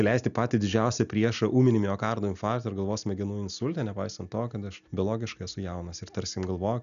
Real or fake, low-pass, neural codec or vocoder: real; 7.2 kHz; none